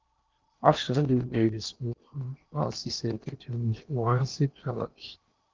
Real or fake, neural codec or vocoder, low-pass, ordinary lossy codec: fake; codec, 16 kHz in and 24 kHz out, 0.8 kbps, FocalCodec, streaming, 65536 codes; 7.2 kHz; Opus, 24 kbps